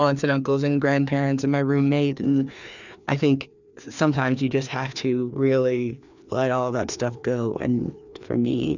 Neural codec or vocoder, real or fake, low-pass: codec, 16 kHz, 2 kbps, FreqCodec, larger model; fake; 7.2 kHz